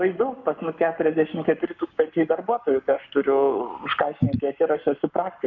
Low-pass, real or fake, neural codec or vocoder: 7.2 kHz; fake; codec, 44.1 kHz, 7.8 kbps, Pupu-Codec